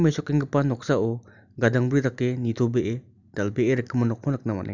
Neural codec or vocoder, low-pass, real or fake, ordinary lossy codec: none; 7.2 kHz; real; none